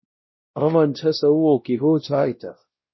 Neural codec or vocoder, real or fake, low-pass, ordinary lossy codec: codec, 16 kHz, 0.5 kbps, X-Codec, WavLM features, trained on Multilingual LibriSpeech; fake; 7.2 kHz; MP3, 24 kbps